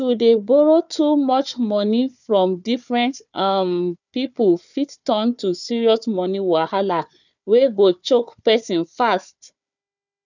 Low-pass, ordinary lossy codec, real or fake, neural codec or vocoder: 7.2 kHz; none; fake; codec, 16 kHz, 4 kbps, FunCodec, trained on Chinese and English, 50 frames a second